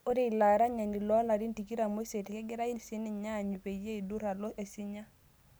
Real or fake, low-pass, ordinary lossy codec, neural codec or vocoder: real; none; none; none